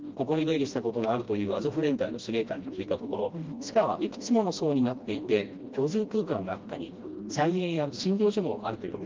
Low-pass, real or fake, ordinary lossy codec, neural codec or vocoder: 7.2 kHz; fake; Opus, 32 kbps; codec, 16 kHz, 1 kbps, FreqCodec, smaller model